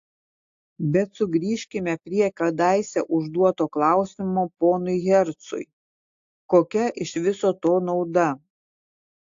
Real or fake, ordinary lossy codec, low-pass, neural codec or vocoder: real; AAC, 48 kbps; 7.2 kHz; none